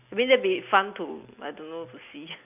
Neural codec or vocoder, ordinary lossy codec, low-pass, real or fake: none; none; 3.6 kHz; real